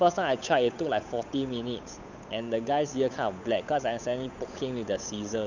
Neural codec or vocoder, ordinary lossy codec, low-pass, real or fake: codec, 16 kHz, 8 kbps, FunCodec, trained on Chinese and English, 25 frames a second; none; 7.2 kHz; fake